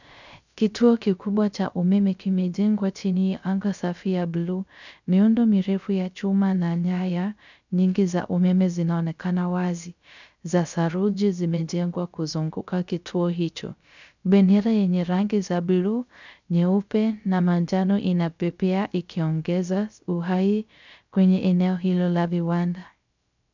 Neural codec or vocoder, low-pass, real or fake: codec, 16 kHz, 0.3 kbps, FocalCodec; 7.2 kHz; fake